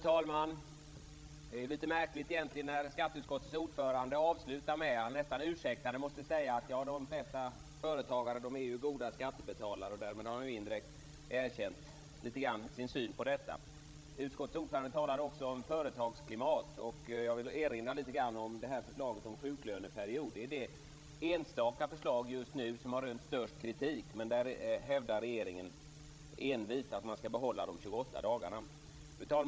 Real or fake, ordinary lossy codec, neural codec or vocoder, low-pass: fake; none; codec, 16 kHz, 16 kbps, FreqCodec, larger model; none